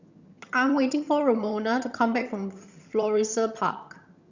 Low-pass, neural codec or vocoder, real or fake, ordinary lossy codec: 7.2 kHz; vocoder, 22.05 kHz, 80 mel bands, HiFi-GAN; fake; Opus, 64 kbps